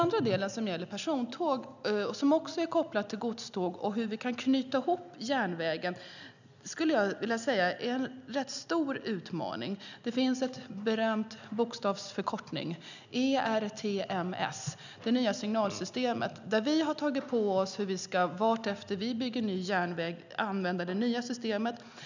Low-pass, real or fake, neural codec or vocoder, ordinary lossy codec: 7.2 kHz; real; none; none